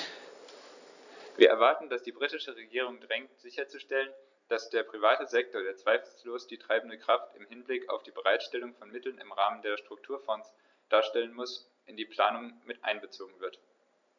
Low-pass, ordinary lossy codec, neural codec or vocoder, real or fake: 7.2 kHz; none; none; real